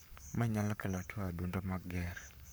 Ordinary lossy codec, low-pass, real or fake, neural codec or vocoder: none; none; fake; codec, 44.1 kHz, 7.8 kbps, Pupu-Codec